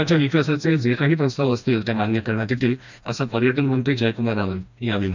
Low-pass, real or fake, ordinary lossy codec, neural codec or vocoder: 7.2 kHz; fake; none; codec, 16 kHz, 1 kbps, FreqCodec, smaller model